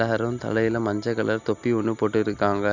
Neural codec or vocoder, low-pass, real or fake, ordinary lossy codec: none; 7.2 kHz; real; none